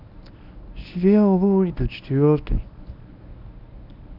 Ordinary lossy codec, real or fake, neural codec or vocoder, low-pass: none; fake; codec, 24 kHz, 0.9 kbps, WavTokenizer, medium speech release version 1; 5.4 kHz